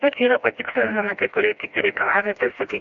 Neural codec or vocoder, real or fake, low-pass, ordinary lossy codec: codec, 16 kHz, 1 kbps, FreqCodec, smaller model; fake; 7.2 kHz; MP3, 64 kbps